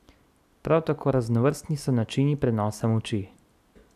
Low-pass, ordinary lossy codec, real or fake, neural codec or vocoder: 14.4 kHz; none; real; none